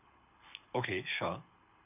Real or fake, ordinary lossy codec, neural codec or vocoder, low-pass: fake; none; codec, 24 kHz, 6 kbps, HILCodec; 3.6 kHz